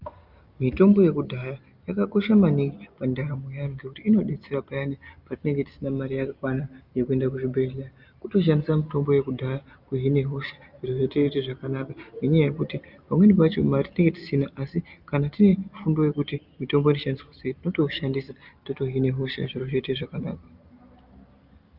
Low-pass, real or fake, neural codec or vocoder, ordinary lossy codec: 5.4 kHz; real; none; Opus, 24 kbps